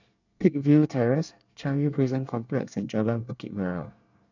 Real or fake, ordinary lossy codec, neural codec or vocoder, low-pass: fake; none; codec, 24 kHz, 1 kbps, SNAC; 7.2 kHz